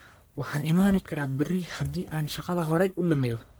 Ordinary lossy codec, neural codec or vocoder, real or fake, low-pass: none; codec, 44.1 kHz, 1.7 kbps, Pupu-Codec; fake; none